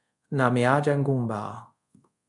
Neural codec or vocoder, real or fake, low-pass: codec, 24 kHz, 0.5 kbps, DualCodec; fake; 10.8 kHz